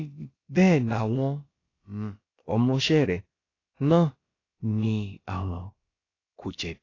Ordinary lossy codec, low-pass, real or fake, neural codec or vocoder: AAC, 32 kbps; 7.2 kHz; fake; codec, 16 kHz, about 1 kbps, DyCAST, with the encoder's durations